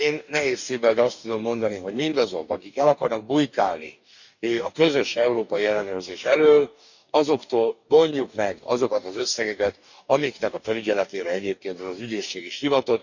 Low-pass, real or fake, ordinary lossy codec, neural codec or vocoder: 7.2 kHz; fake; none; codec, 44.1 kHz, 2.6 kbps, DAC